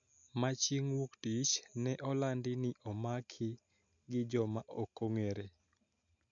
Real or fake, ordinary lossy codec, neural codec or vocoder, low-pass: real; none; none; 7.2 kHz